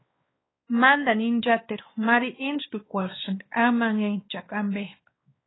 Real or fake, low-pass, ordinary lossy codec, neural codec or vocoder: fake; 7.2 kHz; AAC, 16 kbps; codec, 16 kHz, 2 kbps, X-Codec, WavLM features, trained on Multilingual LibriSpeech